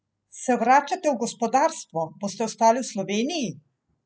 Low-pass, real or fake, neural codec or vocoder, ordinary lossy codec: none; real; none; none